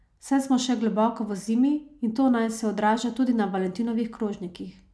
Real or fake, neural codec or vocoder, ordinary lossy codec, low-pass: real; none; none; none